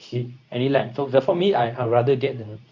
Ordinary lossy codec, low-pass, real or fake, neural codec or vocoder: none; 7.2 kHz; fake; codec, 24 kHz, 0.9 kbps, WavTokenizer, medium speech release version 2